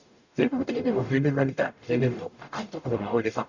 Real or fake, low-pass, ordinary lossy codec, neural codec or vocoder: fake; 7.2 kHz; none; codec, 44.1 kHz, 0.9 kbps, DAC